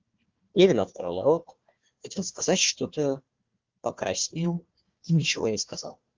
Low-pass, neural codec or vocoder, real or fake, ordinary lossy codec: 7.2 kHz; codec, 16 kHz, 1 kbps, FunCodec, trained on Chinese and English, 50 frames a second; fake; Opus, 32 kbps